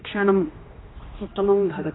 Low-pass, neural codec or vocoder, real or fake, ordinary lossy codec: 7.2 kHz; codec, 16 kHz, 1 kbps, X-Codec, HuBERT features, trained on general audio; fake; AAC, 16 kbps